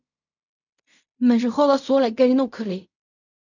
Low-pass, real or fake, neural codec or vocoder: 7.2 kHz; fake; codec, 16 kHz in and 24 kHz out, 0.4 kbps, LongCat-Audio-Codec, fine tuned four codebook decoder